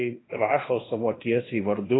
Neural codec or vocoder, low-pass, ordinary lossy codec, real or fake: codec, 16 kHz in and 24 kHz out, 0.9 kbps, LongCat-Audio-Codec, fine tuned four codebook decoder; 7.2 kHz; AAC, 16 kbps; fake